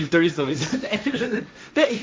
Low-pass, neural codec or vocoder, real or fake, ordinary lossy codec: none; codec, 16 kHz, 1.1 kbps, Voila-Tokenizer; fake; none